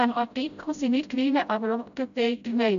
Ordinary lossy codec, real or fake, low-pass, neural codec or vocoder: none; fake; 7.2 kHz; codec, 16 kHz, 0.5 kbps, FreqCodec, smaller model